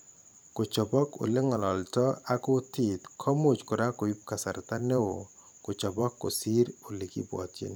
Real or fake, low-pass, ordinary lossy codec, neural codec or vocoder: fake; none; none; vocoder, 44.1 kHz, 128 mel bands every 512 samples, BigVGAN v2